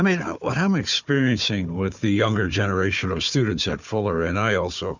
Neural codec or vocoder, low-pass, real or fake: codec, 16 kHz, 4 kbps, FunCodec, trained on Chinese and English, 50 frames a second; 7.2 kHz; fake